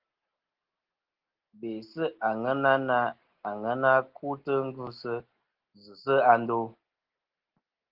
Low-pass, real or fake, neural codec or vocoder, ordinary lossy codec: 5.4 kHz; real; none; Opus, 16 kbps